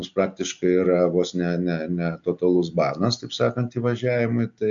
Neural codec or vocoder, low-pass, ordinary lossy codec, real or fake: none; 7.2 kHz; MP3, 64 kbps; real